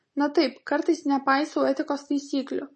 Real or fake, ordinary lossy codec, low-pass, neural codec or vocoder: real; MP3, 32 kbps; 10.8 kHz; none